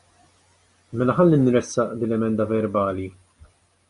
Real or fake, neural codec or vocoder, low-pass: real; none; 10.8 kHz